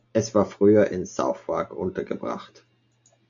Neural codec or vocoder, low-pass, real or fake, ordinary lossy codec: none; 7.2 kHz; real; AAC, 64 kbps